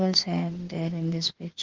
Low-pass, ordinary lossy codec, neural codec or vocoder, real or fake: 7.2 kHz; Opus, 16 kbps; autoencoder, 48 kHz, 32 numbers a frame, DAC-VAE, trained on Japanese speech; fake